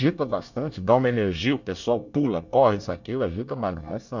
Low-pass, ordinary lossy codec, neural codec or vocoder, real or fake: 7.2 kHz; none; codec, 24 kHz, 1 kbps, SNAC; fake